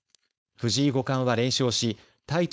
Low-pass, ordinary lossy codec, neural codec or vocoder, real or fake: none; none; codec, 16 kHz, 4.8 kbps, FACodec; fake